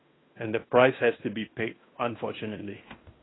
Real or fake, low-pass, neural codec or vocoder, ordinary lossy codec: fake; 7.2 kHz; codec, 16 kHz in and 24 kHz out, 0.9 kbps, LongCat-Audio-Codec, fine tuned four codebook decoder; AAC, 16 kbps